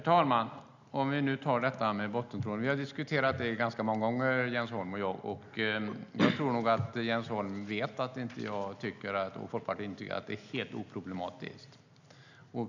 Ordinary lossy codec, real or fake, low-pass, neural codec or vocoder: none; real; 7.2 kHz; none